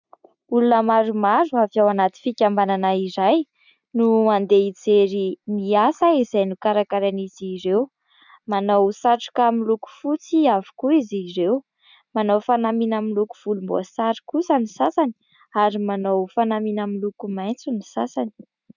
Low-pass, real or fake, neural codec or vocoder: 7.2 kHz; real; none